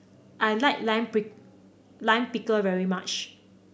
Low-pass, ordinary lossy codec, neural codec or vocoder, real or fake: none; none; none; real